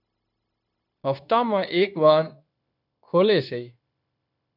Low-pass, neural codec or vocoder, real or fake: 5.4 kHz; codec, 16 kHz, 0.9 kbps, LongCat-Audio-Codec; fake